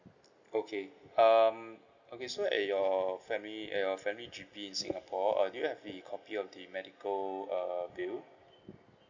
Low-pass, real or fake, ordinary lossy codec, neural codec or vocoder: 7.2 kHz; real; none; none